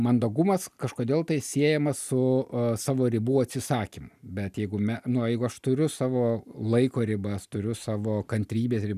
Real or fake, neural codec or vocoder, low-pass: real; none; 14.4 kHz